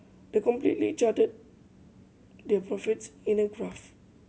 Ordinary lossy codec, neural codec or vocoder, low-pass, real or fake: none; none; none; real